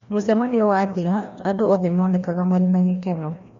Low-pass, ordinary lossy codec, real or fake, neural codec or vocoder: 7.2 kHz; MP3, 48 kbps; fake; codec, 16 kHz, 1 kbps, FreqCodec, larger model